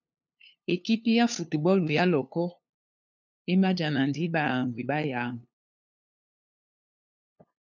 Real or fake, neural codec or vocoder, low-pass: fake; codec, 16 kHz, 2 kbps, FunCodec, trained on LibriTTS, 25 frames a second; 7.2 kHz